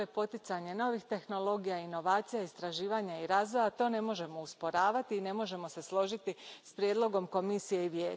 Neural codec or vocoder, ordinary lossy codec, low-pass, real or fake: none; none; none; real